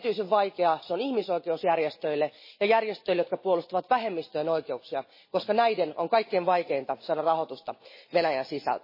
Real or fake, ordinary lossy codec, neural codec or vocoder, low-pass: real; MP3, 24 kbps; none; 5.4 kHz